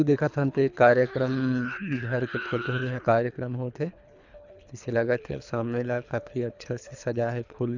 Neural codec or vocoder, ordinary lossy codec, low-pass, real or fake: codec, 24 kHz, 3 kbps, HILCodec; none; 7.2 kHz; fake